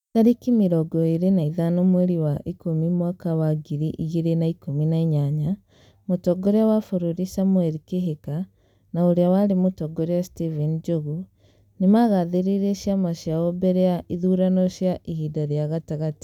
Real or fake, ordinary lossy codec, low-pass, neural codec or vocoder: real; none; 19.8 kHz; none